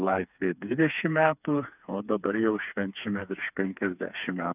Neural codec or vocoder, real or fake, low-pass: codec, 16 kHz, 4 kbps, FreqCodec, smaller model; fake; 3.6 kHz